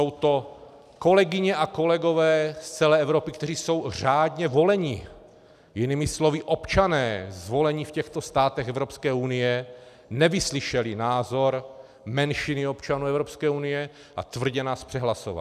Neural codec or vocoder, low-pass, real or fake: none; 14.4 kHz; real